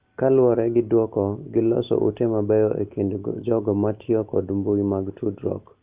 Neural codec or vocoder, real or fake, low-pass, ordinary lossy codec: none; real; 3.6 kHz; Opus, 32 kbps